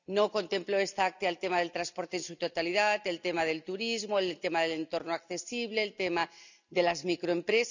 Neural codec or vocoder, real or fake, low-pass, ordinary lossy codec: none; real; 7.2 kHz; none